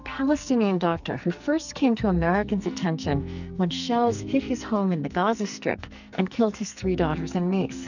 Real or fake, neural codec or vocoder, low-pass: fake; codec, 44.1 kHz, 2.6 kbps, SNAC; 7.2 kHz